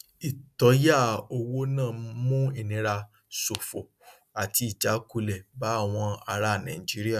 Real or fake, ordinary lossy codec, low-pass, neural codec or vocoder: real; none; 14.4 kHz; none